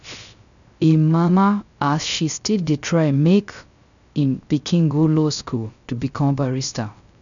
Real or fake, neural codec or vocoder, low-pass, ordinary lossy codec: fake; codec, 16 kHz, 0.3 kbps, FocalCodec; 7.2 kHz; none